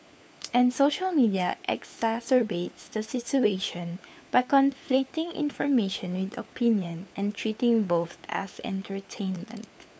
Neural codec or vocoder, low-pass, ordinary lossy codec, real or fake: codec, 16 kHz, 4 kbps, FunCodec, trained on LibriTTS, 50 frames a second; none; none; fake